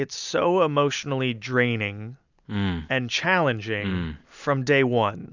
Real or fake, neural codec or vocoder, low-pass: real; none; 7.2 kHz